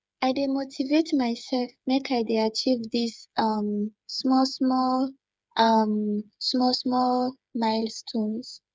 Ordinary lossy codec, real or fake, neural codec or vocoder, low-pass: none; fake; codec, 16 kHz, 8 kbps, FreqCodec, smaller model; none